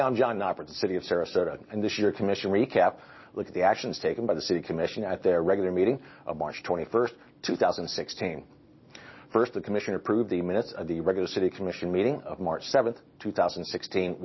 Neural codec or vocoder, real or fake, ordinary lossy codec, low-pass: none; real; MP3, 24 kbps; 7.2 kHz